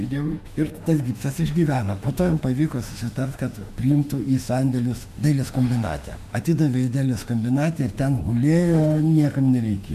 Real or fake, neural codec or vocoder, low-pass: fake; autoencoder, 48 kHz, 32 numbers a frame, DAC-VAE, trained on Japanese speech; 14.4 kHz